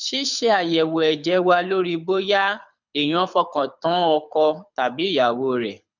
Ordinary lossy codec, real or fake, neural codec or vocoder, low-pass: none; fake; codec, 24 kHz, 6 kbps, HILCodec; 7.2 kHz